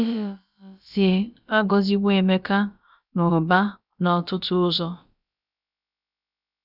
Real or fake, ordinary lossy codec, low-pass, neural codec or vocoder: fake; none; 5.4 kHz; codec, 16 kHz, about 1 kbps, DyCAST, with the encoder's durations